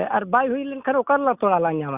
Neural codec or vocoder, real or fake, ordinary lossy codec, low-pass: none; real; Opus, 64 kbps; 3.6 kHz